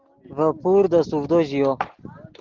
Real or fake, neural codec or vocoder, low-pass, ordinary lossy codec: real; none; 7.2 kHz; Opus, 16 kbps